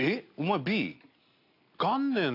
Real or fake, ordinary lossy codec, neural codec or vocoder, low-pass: real; AAC, 32 kbps; none; 5.4 kHz